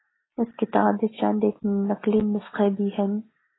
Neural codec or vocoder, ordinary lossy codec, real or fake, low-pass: none; AAC, 16 kbps; real; 7.2 kHz